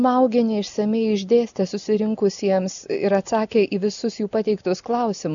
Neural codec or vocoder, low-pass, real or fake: none; 7.2 kHz; real